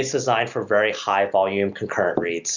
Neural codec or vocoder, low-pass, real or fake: none; 7.2 kHz; real